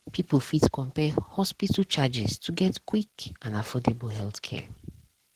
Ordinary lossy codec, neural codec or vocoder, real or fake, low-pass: Opus, 16 kbps; codec, 44.1 kHz, 7.8 kbps, Pupu-Codec; fake; 14.4 kHz